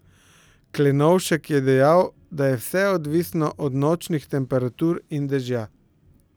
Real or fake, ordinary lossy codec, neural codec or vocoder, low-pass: real; none; none; none